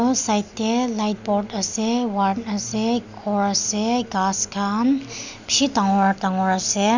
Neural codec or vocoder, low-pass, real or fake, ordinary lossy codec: none; 7.2 kHz; real; none